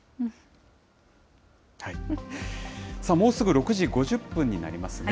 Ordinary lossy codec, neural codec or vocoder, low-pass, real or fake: none; none; none; real